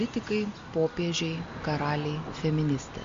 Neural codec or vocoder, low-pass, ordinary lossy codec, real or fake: none; 7.2 kHz; MP3, 48 kbps; real